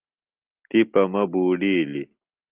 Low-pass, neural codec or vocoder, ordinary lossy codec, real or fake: 3.6 kHz; none; Opus, 32 kbps; real